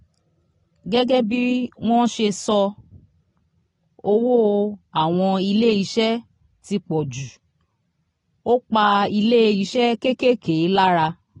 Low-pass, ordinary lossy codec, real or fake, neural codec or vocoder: 10.8 kHz; AAC, 32 kbps; real; none